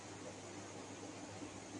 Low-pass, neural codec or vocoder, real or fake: 10.8 kHz; none; real